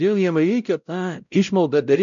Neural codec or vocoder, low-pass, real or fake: codec, 16 kHz, 0.5 kbps, X-Codec, WavLM features, trained on Multilingual LibriSpeech; 7.2 kHz; fake